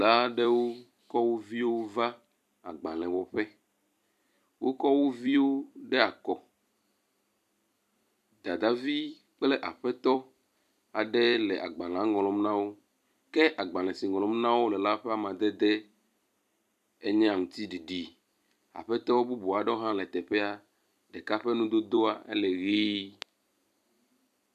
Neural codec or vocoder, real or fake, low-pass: vocoder, 44.1 kHz, 128 mel bands every 256 samples, BigVGAN v2; fake; 14.4 kHz